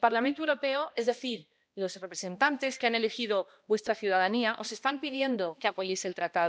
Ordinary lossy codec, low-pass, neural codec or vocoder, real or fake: none; none; codec, 16 kHz, 1 kbps, X-Codec, HuBERT features, trained on balanced general audio; fake